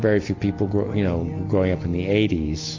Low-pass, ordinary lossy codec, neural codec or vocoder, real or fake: 7.2 kHz; AAC, 48 kbps; none; real